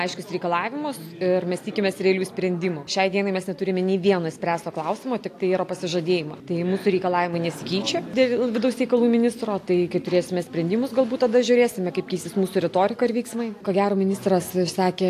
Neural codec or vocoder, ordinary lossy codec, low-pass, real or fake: none; AAC, 64 kbps; 14.4 kHz; real